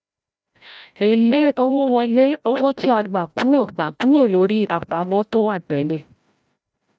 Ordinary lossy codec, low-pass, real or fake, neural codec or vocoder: none; none; fake; codec, 16 kHz, 0.5 kbps, FreqCodec, larger model